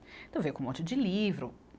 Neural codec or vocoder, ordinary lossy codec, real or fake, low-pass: none; none; real; none